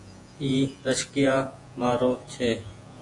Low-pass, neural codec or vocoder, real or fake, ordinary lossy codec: 10.8 kHz; vocoder, 48 kHz, 128 mel bands, Vocos; fake; AAC, 48 kbps